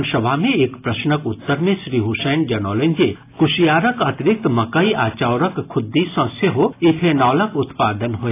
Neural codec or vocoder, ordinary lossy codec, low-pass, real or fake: none; AAC, 24 kbps; 3.6 kHz; real